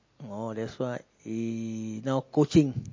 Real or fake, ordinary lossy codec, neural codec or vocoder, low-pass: real; MP3, 32 kbps; none; 7.2 kHz